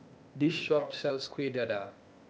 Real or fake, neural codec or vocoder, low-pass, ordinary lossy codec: fake; codec, 16 kHz, 0.8 kbps, ZipCodec; none; none